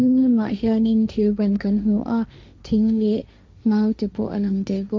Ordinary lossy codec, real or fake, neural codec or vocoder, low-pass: none; fake; codec, 16 kHz, 1.1 kbps, Voila-Tokenizer; none